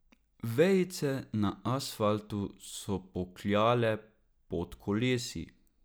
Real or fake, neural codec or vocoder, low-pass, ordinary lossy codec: real; none; none; none